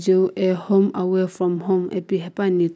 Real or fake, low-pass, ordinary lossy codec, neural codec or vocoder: real; none; none; none